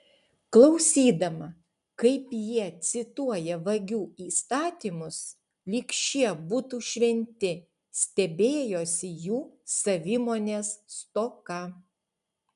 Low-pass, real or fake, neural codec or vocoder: 10.8 kHz; real; none